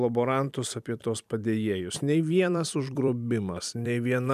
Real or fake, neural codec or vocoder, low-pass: fake; vocoder, 44.1 kHz, 128 mel bands every 256 samples, BigVGAN v2; 14.4 kHz